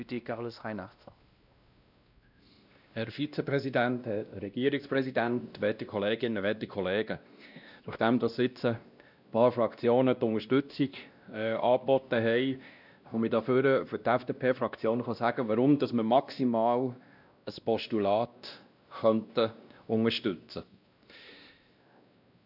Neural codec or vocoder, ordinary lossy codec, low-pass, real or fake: codec, 16 kHz, 1 kbps, X-Codec, WavLM features, trained on Multilingual LibriSpeech; none; 5.4 kHz; fake